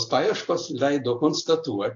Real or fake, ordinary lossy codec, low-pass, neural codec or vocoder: real; AAC, 48 kbps; 7.2 kHz; none